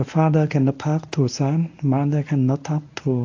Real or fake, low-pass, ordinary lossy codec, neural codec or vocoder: fake; 7.2 kHz; AAC, 48 kbps; codec, 24 kHz, 0.9 kbps, WavTokenizer, medium speech release version 1